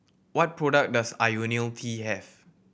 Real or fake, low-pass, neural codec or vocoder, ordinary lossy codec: real; none; none; none